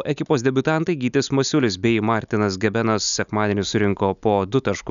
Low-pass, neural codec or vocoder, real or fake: 7.2 kHz; none; real